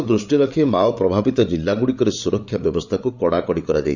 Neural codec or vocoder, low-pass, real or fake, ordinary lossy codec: codec, 16 kHz, 8 kbps, FreqCodec, larger model; 7.2 kHz; fake; none